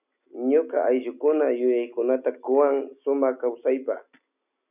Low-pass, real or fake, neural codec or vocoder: 3.6 kHz; real; none